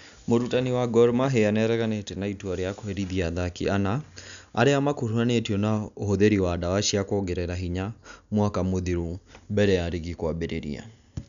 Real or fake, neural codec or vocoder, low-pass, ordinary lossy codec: real; none; 7.2 kHz; none